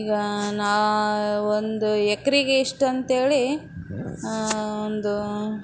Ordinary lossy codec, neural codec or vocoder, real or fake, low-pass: none; none; real; none